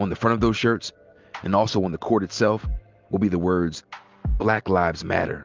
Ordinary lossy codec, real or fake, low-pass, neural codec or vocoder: Opus, 32 kbps; real; 7.2 kHz; none